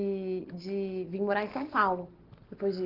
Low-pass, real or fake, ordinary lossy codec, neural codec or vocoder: 5.4 kHz; real; Opus, 16 kbps; none